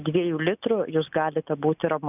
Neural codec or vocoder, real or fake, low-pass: none; real; 3.6 kHz